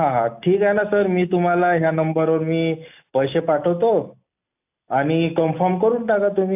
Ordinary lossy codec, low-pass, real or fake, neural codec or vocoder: AAC, 32 kbps; 3.6 kHz; real; none